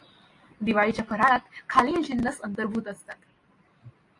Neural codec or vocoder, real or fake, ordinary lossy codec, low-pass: none; real; AAC, 48 kbps; 10.8 kHz